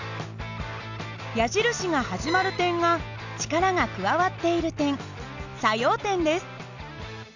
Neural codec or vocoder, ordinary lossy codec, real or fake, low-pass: none; none; real; 7.2 kHz